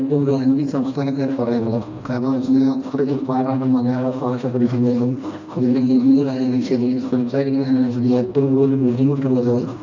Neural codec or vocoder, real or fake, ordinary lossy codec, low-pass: codec, 16 kHz, 1 kbps, FreqCodec, smaller model; fake; AAC, 48 kbps; 7.2 kHz